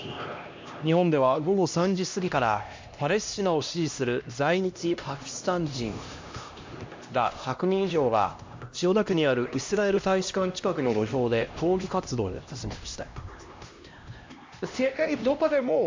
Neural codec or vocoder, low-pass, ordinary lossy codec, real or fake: codec, 16 kHz, 1 kbps, X-Codec, HuBERT features, trained on LibriSpeech; 7.2 kHz; MP3, 48 kbps; fake